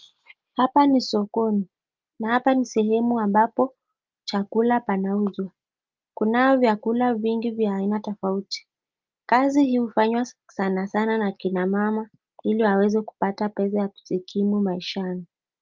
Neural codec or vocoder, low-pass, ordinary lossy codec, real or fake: none; 7.2 kHz; Opus, 24 kbps; real